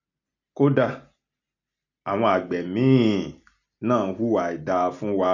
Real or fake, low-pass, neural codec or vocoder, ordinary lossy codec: real; 7.2 kHz; none; none